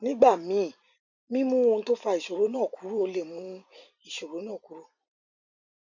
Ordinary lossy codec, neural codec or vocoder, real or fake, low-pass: none; vocoder, 44.1 kHz, 128 mel bands every 256 samples, BigVGAN v2; fake; 7.2 kHz